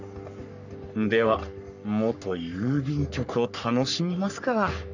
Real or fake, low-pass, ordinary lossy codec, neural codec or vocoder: fake; 7.2 kHz; none; codec, 44.1 kHz, 3.4 kbps, Pupu-Codec